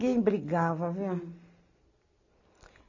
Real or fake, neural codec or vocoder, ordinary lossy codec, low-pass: real; none; AAC, 48 kbps; 7.2 kHz